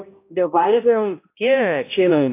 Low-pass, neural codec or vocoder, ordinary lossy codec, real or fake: 3.6 kHz; codec, 16 kHz, 0.5 kbps, X-Codec, HuBERT features, trained on balanced general audio; AAC, 24 kbps; fake